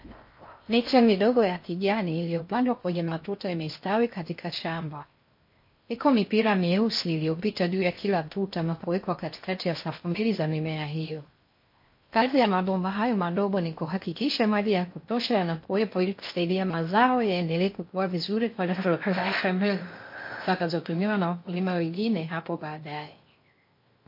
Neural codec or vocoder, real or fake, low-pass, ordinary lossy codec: codec, 16 kHz in and 24 kHz out, 0.6 kbps, FocalCodec, streaming, 4096 codes; fake; 5.4 kHz; MP3, 32 kbps